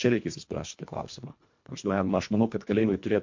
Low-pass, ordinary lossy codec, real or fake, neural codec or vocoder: 7.2 kHz; MP3, 48 kbps; fake; codec, 24 kHz, 1.5 kbps, HILCodec